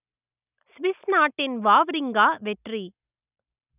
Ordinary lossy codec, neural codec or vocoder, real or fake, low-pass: none; none; real; 3.6 kHz